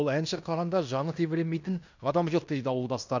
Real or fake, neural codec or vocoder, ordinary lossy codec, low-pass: fake; codec, 16 kHz in and 24 kHz out, 0.9 kbps, LongCat-Audio-Codec, fine tuned four codebook decoder; none; 7.2 kHz